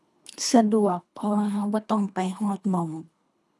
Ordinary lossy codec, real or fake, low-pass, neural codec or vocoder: none; fake; none; codec, 24 kHz, 3 kbps, HILCodec